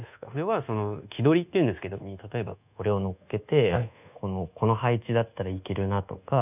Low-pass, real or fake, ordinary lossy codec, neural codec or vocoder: 3.6 kHz; fake; none; codec, 24 kHz, 1.2 kbps, DualCodec